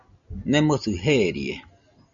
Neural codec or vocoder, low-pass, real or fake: none; 7.2 kHz; real